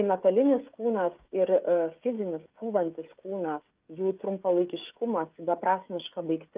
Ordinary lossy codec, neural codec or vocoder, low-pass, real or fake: Opus, 24 kbps; codec, 16 kHz, 16 kbps, FreqCodec, smaller model; 3.6 kHz; fake